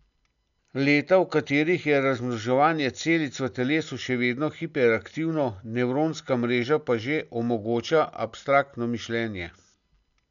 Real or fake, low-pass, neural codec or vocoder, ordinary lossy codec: real; 7.2 kHz; none; none